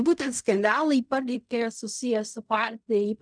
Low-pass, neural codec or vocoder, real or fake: 9.9 kHz; codec, 16 kHz in and 24 kHz out, 0.4 kbps, LongCat-Audio-Codec, fine tuned four codebook decoder; fake